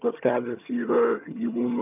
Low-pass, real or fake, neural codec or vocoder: 3.6 kHz; fake; vocoder, 22.05 kHz, 80 mel bands, HiFi-GAN